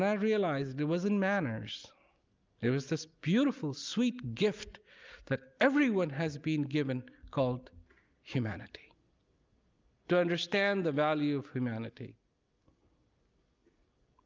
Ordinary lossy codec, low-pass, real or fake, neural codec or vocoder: Opus, 24 kbps; 7.2 kHz; real; none